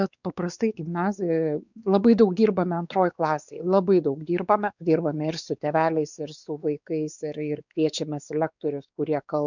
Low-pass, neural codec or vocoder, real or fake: 7.2 kHz; codec, 16 kHz, 4 kbps, X-Codec, WavLM features, trained on Multilingual LibriSpeech; fake